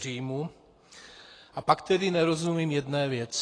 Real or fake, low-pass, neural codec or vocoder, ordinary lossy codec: real; 9.9 kHz; none; AAC, 32 kbps